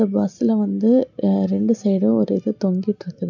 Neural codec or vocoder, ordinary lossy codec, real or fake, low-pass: none; none; real; 7.2 kHz